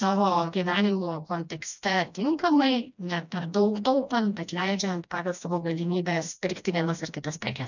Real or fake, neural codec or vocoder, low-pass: fake; codec, 16 kHz, 1 kbps, FreqCodec, smaller model; 7.2 kHz